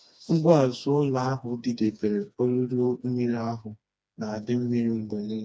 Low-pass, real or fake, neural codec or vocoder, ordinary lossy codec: none; fake; codec, 16 kHz, 2 kbps, FreqCodec, smaller model; none